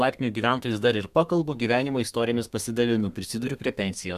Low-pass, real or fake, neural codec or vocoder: 14.4 kHz; fake; codec, 32 kHz, 1.9 kbps, SNAC